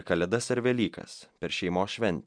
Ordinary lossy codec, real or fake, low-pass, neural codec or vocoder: MP3, 96 kbps; real; 9.9 kHz; none